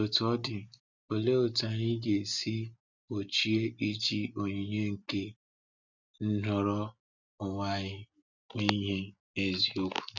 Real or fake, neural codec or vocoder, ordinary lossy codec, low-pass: real; none; none; 7.2 kHz